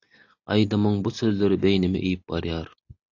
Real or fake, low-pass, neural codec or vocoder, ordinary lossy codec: real; 7.2 kHz; none; AAC, 48 kbps